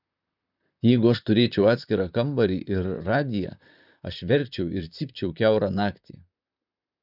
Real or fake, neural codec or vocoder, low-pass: fake; vocoder, 44.1 kHz, 128 mel bands, Pupu-Vocoder; 5.4 kHz